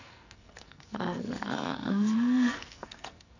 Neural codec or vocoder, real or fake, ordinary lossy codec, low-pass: codec, 44.1 kHz, 2.6 kbps, SNAC; fake; none; 7.2 kHz